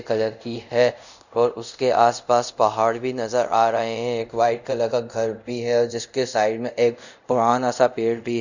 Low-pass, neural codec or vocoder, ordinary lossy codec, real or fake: 7.2 kHz; codec, 24 kHz, 0.5 kbps, DualCodec; none; fake